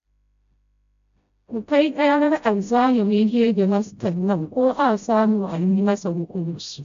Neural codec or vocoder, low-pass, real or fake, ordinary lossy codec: codec, 16 kHz, 0.5 kbps, FreqCodec, smaller model; 7.2 kHz; fake; AAC, 64 kbps